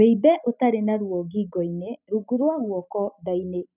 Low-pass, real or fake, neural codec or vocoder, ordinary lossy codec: 3.6 kHz; real; none; none